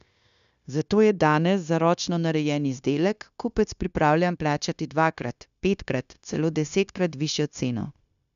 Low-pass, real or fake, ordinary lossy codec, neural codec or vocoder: 7.2 kHz; fake; none; codec, 16 kHz, 0.9 kbps, LongCat-Audio-Codec